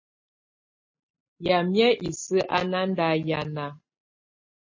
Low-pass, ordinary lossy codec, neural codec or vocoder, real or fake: 7.2 kHz; MP3, 32 kbps; none; real